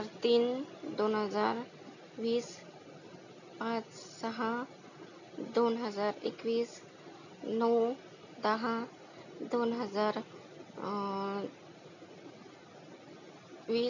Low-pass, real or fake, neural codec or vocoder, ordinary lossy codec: 7.2 kHz; real; none; none